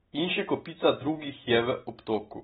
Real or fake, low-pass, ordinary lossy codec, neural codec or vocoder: real; 19.8 kHz; AAC, 16 kbps; none